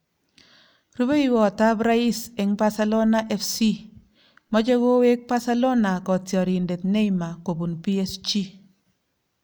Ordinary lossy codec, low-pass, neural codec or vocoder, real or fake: none; none; none; real